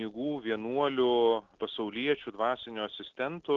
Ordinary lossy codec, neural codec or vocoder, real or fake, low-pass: Opus, 16 kbps; none; real; 7.2 kHz